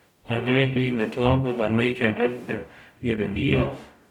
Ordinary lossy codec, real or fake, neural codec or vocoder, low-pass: none; fake; codec, 44.1 kHz, 0.9 kbps, DAC; 19.8 kHz